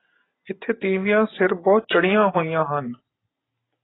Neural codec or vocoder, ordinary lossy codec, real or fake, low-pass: none; AAC, 16 kbps; real; 7.2 kHz